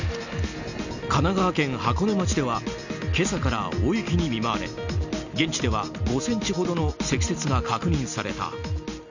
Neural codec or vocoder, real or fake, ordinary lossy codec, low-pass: none; real; none; 7.2 kHz